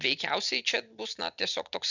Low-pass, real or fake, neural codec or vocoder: 7.2 kHz; real; none